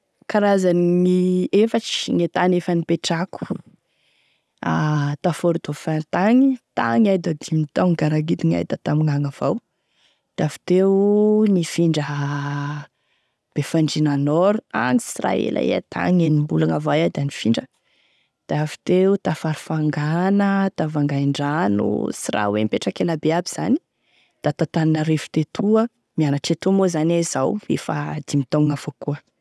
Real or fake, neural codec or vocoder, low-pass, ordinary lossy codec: real; none; none; none